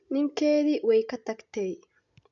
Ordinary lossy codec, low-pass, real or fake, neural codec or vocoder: none; 7.2 kHz; real; none